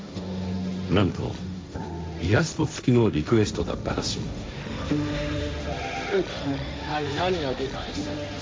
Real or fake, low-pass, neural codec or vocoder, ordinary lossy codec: fake; none; codec, 16 kHz, 1.1 kbps, Voila-Tokenizer; none